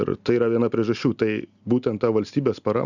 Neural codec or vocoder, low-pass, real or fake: none; 7.2 kHz; real